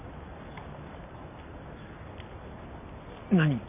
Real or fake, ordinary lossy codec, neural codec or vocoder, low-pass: real; none; none; 3.6 kHz